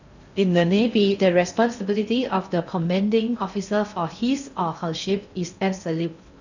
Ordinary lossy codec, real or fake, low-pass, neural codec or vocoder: none; fake; 7.2 kHz; codec, 16 kHz in and 24 kHz out, 0.6 kbps, FocalCodec, streaming, 4096 codes